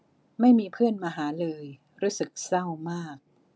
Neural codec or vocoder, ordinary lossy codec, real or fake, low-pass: none; none; real; none